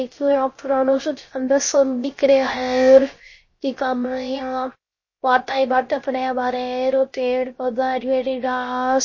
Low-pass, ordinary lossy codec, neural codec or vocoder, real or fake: 7.2 kHz; MP3, 32 kbps; codec, 16 kHz, 0.3 kbps, FocalCodec; fake